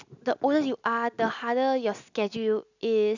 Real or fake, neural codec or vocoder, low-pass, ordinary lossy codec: real; none; 7.2 kHz; none